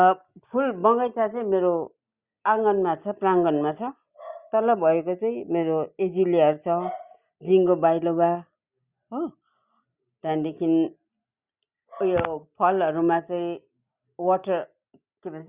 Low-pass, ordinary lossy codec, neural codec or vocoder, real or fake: 3.6 kHz; Opus, 64 kbps; none; real